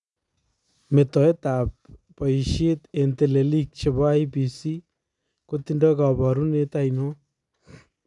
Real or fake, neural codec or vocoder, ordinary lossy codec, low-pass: real; none; none; 10.8 kHz